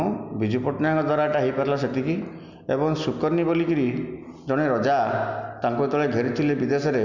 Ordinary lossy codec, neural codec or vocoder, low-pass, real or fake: none; none; 7.2 kHz; real